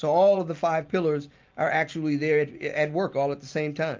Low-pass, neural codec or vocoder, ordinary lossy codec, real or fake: 7.2 kHz; none; Opus, 24 kbps; real